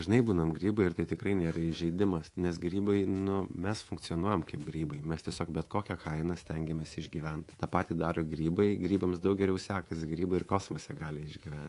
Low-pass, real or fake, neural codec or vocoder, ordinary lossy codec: 10.8 kHz; fake; codec, 24 kHz, 3.1 kbps, DualCodec; AAC, 48 kbps